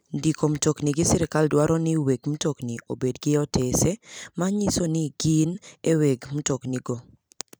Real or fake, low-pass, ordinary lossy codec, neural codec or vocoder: fake; none; none; vocoder, 44.1 kHz, 128 mel bands every 256 samples, BigVGAN v2